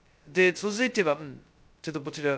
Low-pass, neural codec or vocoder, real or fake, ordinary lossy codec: none; codec, 16 kHz, 0.2 kbps, FocalCodec; fake; none